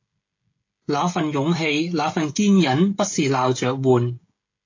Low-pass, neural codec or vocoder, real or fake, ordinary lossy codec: 7.2 kHz; codec, 16 kHz, 16 kbps, FreqCodec, smaller model; fake; AAC, 48 kbps